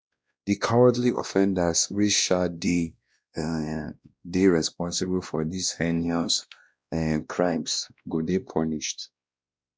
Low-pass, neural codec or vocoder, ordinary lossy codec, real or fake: none; codec, 16 kHz, 1 kbps, X-Codec, WavLM features, trained on Multilingual LibriSpeech; none; fake